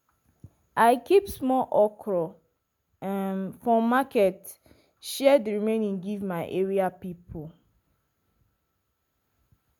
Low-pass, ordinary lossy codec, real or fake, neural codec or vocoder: none; none; real; none